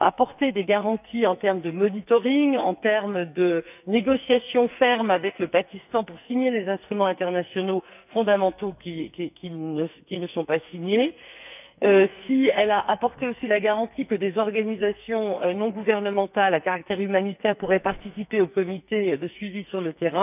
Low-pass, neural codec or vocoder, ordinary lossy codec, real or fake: 3.6 kHz; codec, 44.1 kHz, 2.6 kbps, SNAC; none; fake